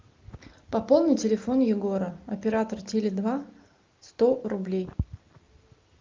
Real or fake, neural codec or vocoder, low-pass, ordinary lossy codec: real; none; 7.2 kHz; Opus, 24 kbps